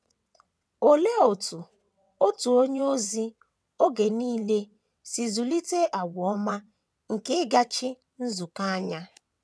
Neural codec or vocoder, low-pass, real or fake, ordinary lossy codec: vocoder, 22.05 kHz, 80 mel bands, WaveNeXt; none; fake; none